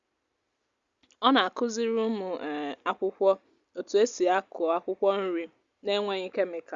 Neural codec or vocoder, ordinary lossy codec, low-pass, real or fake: none; Opus, 64 kbps; 7.2 kHz; real